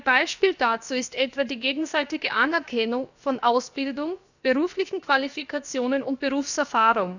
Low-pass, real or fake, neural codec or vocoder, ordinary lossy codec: 7.2 kHz; fake; codec, 16 kHz, about 1 kbps, DyCAST, with the encoder's durations; none